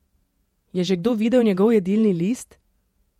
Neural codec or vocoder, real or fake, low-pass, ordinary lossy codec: vocoder, 48 kHz, 128 mel bands, Vocos; fake; 19.8 kHz; MP3, 64 kbps